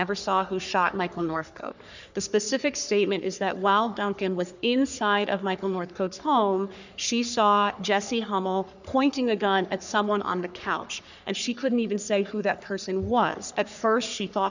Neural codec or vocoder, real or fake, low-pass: codec, 44.1 kHz, 3.4 kbps, Pupu-Codec; fake; 7.2 kHz